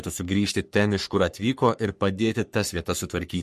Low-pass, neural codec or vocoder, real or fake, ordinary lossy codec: 14.4 kHz; codec, 44.1 kHz, 3.4 kbps, Pupu-Codec; fake; MP3, 64 kbps